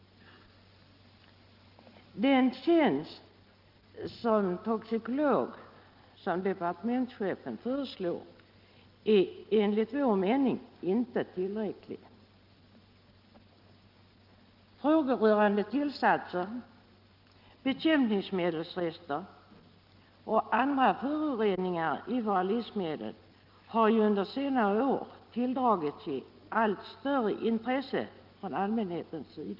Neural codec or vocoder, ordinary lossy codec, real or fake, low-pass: none; Opus, 32 kbps; real; 5.4 kHz